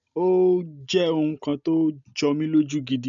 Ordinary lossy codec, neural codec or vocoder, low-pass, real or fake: none; none; 7.2 kHz; real